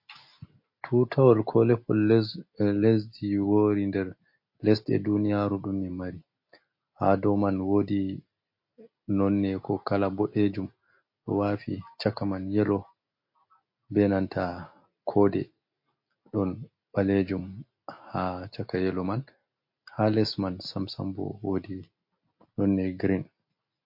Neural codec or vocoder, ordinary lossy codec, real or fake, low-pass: none; MP3, 32 kbps; real; 5.4 kHz